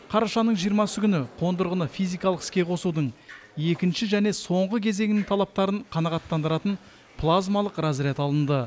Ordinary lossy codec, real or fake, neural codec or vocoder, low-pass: none; real; none; none